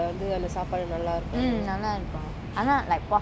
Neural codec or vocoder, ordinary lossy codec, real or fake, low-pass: none; none; real; none